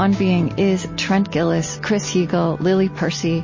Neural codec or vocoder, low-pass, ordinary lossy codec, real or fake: none; 7.2 kHz; MP3, 32 kbps; real